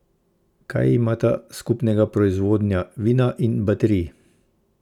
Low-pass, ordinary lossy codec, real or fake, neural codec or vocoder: 19.8 kHz; none; real; none